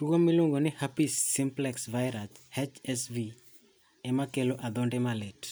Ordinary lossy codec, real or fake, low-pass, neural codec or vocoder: none; real; none; none